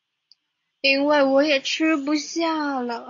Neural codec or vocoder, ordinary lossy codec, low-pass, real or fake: none; AAC, 48 kbps; 7.2 kHz; real